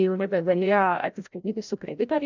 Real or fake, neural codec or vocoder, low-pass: fake; codec, 16 kHz, 0.5 kbps, FreqCodec, larger model; 7.2 kHz